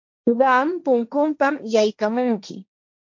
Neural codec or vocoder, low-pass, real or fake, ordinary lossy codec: codec, 16 kHz, 1.1 kbps, Voila-Tokenizer; 7.2 kHz; fake; MP3, 48 kbps